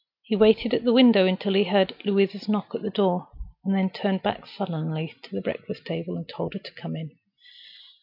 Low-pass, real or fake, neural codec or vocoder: 5.4 kHz; real; none